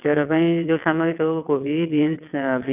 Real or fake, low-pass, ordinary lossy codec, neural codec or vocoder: fake; 3.6 kHz; none; vocoder, 22.05 kHz, 80 mel bands, WaveNeXt